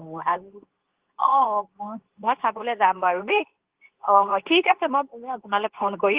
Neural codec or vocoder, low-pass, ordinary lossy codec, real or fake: codec, 24 kHz, 0.9 kbps, WavTokenizer, medium speech release version 2; 3.6 kHz; Opus, 16 kbps; fake